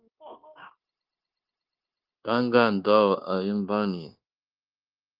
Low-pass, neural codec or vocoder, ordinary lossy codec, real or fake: 5.4 kHz; codec, 16 kHz, 0.9 kbps, LongCat-Audio-Codec; Opus, 24 kbps; fake